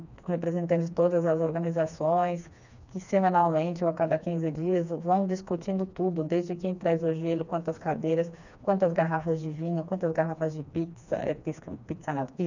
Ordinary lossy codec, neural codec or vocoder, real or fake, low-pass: none; codec, 16 kHz, 2 kbps, FreqCodec, smaller model; fake; 7.2 kHz